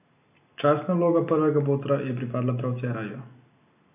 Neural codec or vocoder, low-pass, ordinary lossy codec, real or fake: none; 3.6 kHz; AAC, 32 kbps; real